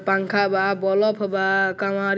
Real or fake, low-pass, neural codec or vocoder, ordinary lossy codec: real; none; none; none